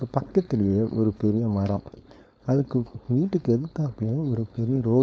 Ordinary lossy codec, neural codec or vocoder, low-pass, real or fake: none; codec, 16 kHz, 4.8 kbps, FACodec; none; fake